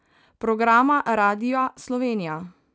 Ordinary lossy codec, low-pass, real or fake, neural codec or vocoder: none; none; real; none